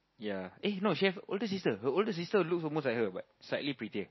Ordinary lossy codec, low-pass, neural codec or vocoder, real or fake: MP3, 24 kbps; 7.2 kHz; none; real